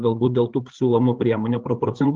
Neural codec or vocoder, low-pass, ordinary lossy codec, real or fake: codec, 16 kHz, 8 kbps, FreqCodec, larger model; 7.2 kHz; Opus, 16 kbps; fake